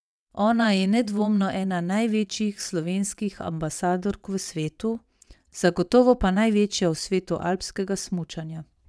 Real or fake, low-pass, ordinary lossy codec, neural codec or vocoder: fake; none; none; vocoder, 22.05 kHz, 80 mel bands, WaveNeXt